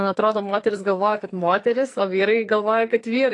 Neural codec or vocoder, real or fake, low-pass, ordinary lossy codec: codec, 44.1 kHz, 2.6 kbps, SNAC; fake; 10.8 kHz; AAC, 48 kbps